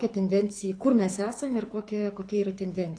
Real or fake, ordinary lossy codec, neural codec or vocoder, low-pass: fake; AAC, 48 kbps; codec, 24 kHz, 6 kbps, HILCodec; 9.9 kHz